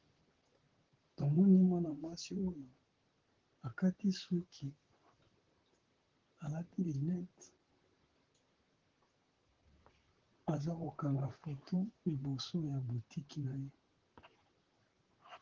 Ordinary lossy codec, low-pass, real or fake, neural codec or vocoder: Opus, 16 kbps; 7.2 kHz; fake; vocoder, 44.1 kHz, 128 mel bands, Pupu-Vocoder